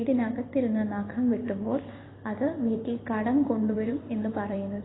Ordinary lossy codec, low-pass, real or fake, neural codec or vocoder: AAC, 16 kbps; 7.2 kHz; fake; vocoder, 44.1 kHz, 128 mel bands every 256 samples, BigVGAN v2